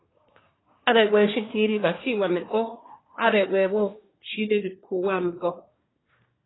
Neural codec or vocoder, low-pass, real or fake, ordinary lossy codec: codec, 24 kHz, 1 kbps, SNAC; 7.2 kHz; fake; AAC, 16 kbps